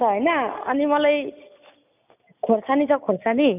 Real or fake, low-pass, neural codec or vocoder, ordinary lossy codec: real; 3.6 kHz; none; none